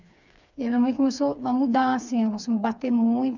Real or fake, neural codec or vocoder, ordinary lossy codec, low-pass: fake; codec, 16 kHz, 4 kbps, FreqCodec, smaller model; none; 7.2 kHz